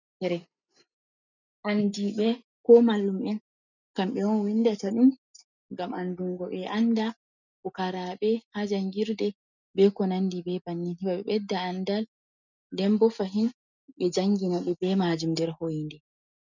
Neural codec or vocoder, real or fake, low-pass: none; real; 7.2 kHz